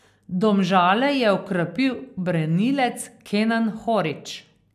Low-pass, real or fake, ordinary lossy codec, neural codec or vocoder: 14.4 kHz; real; none; none